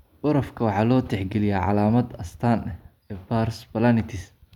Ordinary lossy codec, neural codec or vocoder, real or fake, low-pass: none; none; real; 19.8 kHz